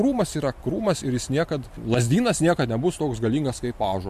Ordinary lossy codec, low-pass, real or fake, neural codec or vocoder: MP3, 64 kbps; 14.4 kHz; fake; vocoder, 44.1 kHz, 128 mel bands every 512 samples, BigVGAN v2